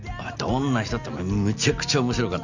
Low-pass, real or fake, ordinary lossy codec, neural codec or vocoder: 7.2 kHz; real; none; none